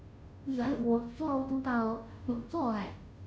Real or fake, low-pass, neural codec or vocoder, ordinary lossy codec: fake; none; codec, 16 kHz, 0.5 kbps, FunCodec, trained on Chinese and English, 25 frames a second; none